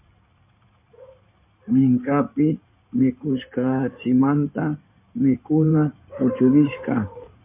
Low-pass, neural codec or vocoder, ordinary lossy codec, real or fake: 3.6 kHz; codec, 16 kHz in and 24 kHz out, 2.2 kbps, FireRedTTS-2 codec; MP3, 32 kbps; fake